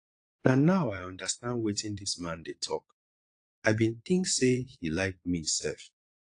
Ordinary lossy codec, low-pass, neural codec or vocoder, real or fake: AAC, 48 kbps; 9.9 kHz; vocoder, 22.05 kHz, 80 mel bands, Vocos; fake